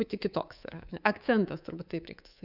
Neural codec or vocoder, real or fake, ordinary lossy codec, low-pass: codec, 24 kHz, 3.1 kbps, DualCodec; fake; AAC, 48 kbps; 5.4 kHz